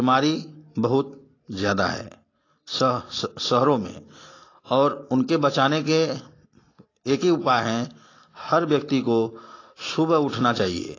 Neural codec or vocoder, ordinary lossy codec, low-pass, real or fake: none; AAC, 32 kbps; 7.2 kHz; real